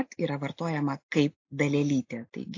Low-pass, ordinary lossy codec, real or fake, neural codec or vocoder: 7.2 kHz; AAC, 48 kbps; real; none